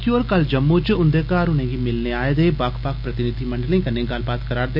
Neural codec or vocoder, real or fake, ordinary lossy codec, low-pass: none; real; none; 5.4 kHz